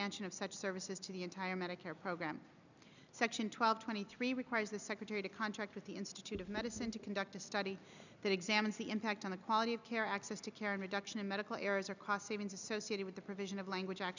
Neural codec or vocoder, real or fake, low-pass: none; real; 7.2 kHz